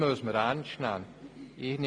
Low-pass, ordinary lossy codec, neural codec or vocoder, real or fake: none; none; none; real